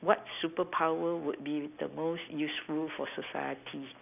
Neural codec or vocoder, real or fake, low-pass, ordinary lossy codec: none; real; 3.6 kHz; none